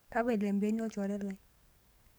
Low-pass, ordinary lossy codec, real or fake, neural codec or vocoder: none; none; fake; codec, 44.1 kHz, 7.8 kbps, DAC